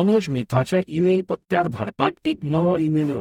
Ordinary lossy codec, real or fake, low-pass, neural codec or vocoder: none; fake; 19.8 kHz; codec, 44.1 kHz, 0.9 kbps, DAC